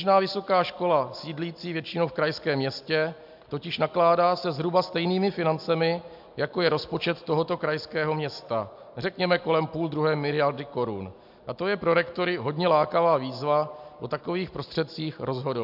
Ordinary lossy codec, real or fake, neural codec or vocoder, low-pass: MP3, 48 kbps; real; none; 5.4 kHz